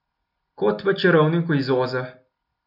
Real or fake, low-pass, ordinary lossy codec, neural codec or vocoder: real; 5.4 kHz; none; none